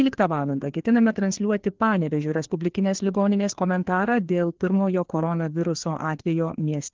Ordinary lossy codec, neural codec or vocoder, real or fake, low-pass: Opus, 16 kbps; codec, 16 kHz, 2 kbps, FreqCodec, larger model; fake; 7.2 kHz